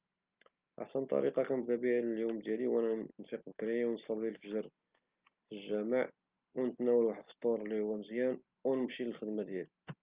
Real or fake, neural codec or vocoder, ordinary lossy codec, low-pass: real; none; Opus, 24 kbps; 3.6 kHz